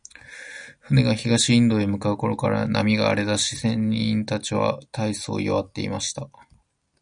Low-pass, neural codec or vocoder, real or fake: 9.9 kHz; none; real